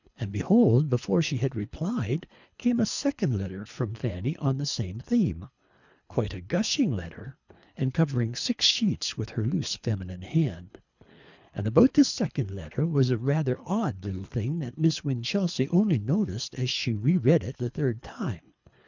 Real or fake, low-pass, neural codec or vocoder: fake; 7.2 kHz; codec, 24 kHz, 3 kbps, HILCodec